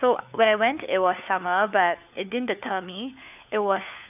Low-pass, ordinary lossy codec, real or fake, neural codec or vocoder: 3.6 kHz; none; fake; codec, 16 kHz, 4 kbps, FunCodec, trained on LibriTTS, 50 frames a second